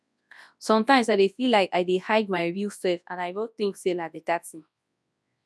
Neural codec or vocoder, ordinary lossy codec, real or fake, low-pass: codec, 24 kHz, 0.9 kbps, WavTokenizer, large speech release; none; fake; none